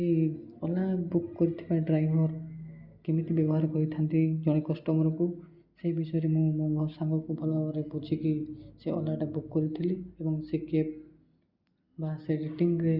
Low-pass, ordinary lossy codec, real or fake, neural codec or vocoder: 5.4 kHz; Opus, 64 kbps; real; none